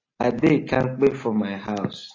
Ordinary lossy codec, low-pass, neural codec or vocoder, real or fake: AAC, 32 kbps; 7.2 kHz; none; real